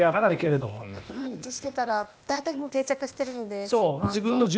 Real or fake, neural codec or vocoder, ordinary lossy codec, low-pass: fake; codec, 16 kHz, 0.8 kbps, ZipCodec; none; none